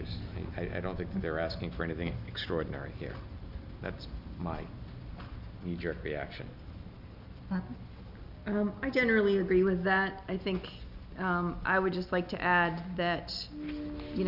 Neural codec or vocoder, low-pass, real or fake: none; 5.4 kHz; real